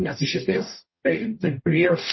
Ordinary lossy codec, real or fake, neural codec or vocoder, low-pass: MP3, 24 kbps; fake; codec, 44.1 kHz, 0.9 kbps, DAC; 7.2 kHz